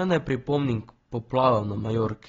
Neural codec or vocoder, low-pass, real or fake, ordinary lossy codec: none; 7.2 kHz; real; AAC, 24 kbps